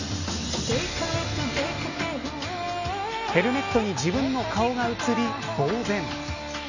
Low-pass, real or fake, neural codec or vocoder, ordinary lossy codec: 7.2 kHz; real; none; none